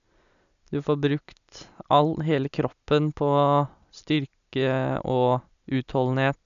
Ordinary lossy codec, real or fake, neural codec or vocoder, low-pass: none; real; none; 7.2 kHz